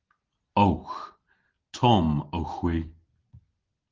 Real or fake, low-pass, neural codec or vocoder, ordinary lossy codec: real; 7.2 kHz; none; Opus, 16 kbps